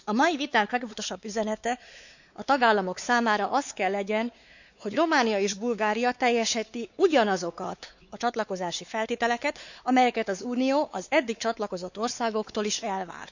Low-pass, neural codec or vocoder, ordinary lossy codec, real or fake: 7.2 kHz; codec, 16 kHz, 4 kbps, X-Codec, WavLM features, trained on Multilingual LibriSpeech; none; fake